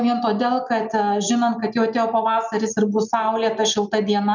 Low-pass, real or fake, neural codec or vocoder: 7.2 kHz; real; none